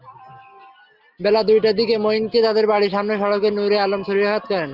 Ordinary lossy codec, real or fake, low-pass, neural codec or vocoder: Opus, 24 kbps; real; 5.4 kHz; none